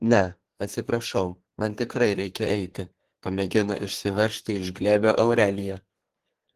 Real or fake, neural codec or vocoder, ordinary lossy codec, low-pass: fake; codec, 32 kHz, 1.9 kbps, SNAC; Opus, 16 kbps; 14.4 kHz